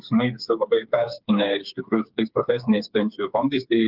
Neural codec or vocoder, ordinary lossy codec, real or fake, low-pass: codec, 16 kHz, 4 kbps, FreqCodec, smaller model; Opus, 32 kbps; fake; 5.4 kHz